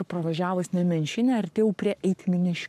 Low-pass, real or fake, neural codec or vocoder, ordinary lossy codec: 14.4 kHz; fake; codec, 44.1 kHz, 7.8 kbps, Pupu-Codec; AAC, 96 kbps